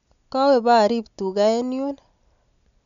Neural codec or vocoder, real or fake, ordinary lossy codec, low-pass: none; real; none; 7.2 kHz